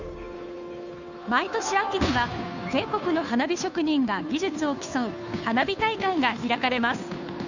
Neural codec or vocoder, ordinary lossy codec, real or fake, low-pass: codec, 16 kHz, 2 kbps, FunCodec, trained on Chinese and English, 25 frames a second; none; fake; 7.2 kHz